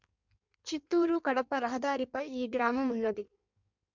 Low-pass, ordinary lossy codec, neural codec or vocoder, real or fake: 7.2 kHz; MP3, 64 kbps; codec, 16 kHz in and 24 kHz out, 1.1 kbps, FireRedTTS-2 codec; fake